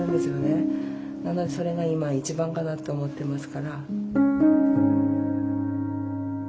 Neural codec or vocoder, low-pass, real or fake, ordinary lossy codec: none; none; real; none